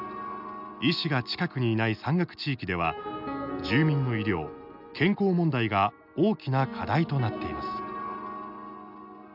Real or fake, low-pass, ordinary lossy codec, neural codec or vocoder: real; 5.4 kHz; none; none